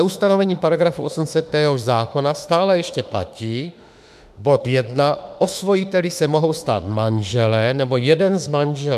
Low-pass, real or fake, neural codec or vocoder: 14.4 kHz; fake; autoencoder, 48 kHz, 32 numbers a frame, DAC-VAE, trained on Japanese speech